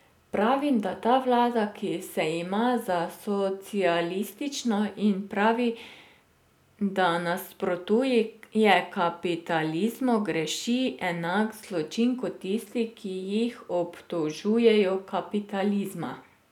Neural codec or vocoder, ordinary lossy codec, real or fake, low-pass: none; none; real; 19.8 kHz